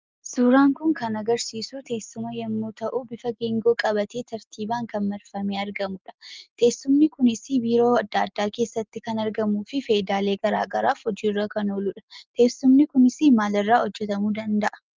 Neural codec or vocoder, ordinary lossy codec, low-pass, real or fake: none; Opus, 32 kbps; 7.2 kHz; real